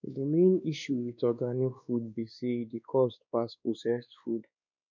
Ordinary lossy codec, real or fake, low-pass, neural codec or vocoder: none; fake; 7.2 kHz; codec, 16 kHz, 2 kbps, X-Codec, WavLM features, trained on Multilingual LibriSpeech